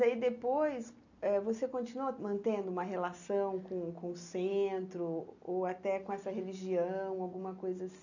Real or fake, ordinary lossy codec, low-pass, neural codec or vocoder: real; none; 7.2 kHz; none